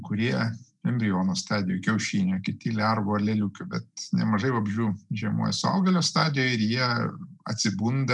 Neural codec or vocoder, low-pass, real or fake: none; 10.8 kHz; real